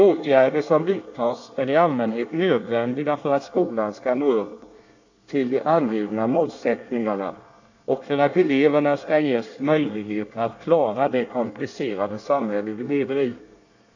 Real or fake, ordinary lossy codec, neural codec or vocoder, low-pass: fake; AAC, 48 kbps; codec, 24 kHz, 1 kbps, SNAC; 7.2 kHz